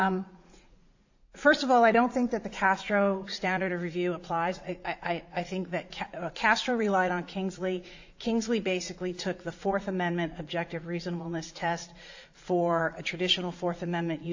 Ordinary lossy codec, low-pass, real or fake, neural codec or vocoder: AAC, 48 kbps; 7.2 kHz; fake; vocoder, 44.1 kHz, 80 mel bands, Vocos